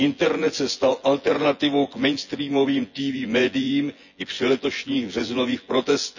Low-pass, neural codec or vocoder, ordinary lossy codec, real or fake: 7.2 kHz; vocoder, 24 kHz, 100 mel bands, Vocos; none; fake